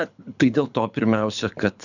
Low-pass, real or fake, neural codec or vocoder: 7.2 kHz; fake; codec, 24 kHz, 6 kbps, HILCodec